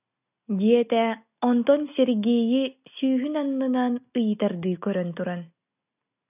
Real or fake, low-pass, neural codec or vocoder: real; 3.6 kHz; none